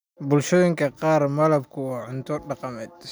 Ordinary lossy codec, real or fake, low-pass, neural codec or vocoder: none; real; none; none